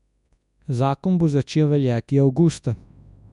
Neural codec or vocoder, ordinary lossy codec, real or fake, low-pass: codec, 24 kHz, 0.9 kbps, WavTokenizer, large speech release; none; fake; 10.8 kHz